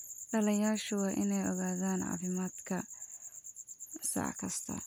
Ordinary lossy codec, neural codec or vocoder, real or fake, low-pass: none; none; real; none